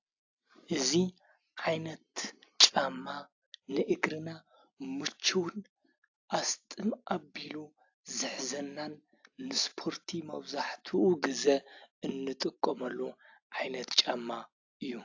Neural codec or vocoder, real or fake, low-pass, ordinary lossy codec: none; real; 7.2 kHz; AAC, 48 kbps